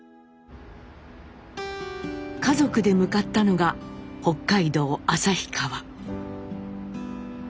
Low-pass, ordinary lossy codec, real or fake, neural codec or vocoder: none; none; real; none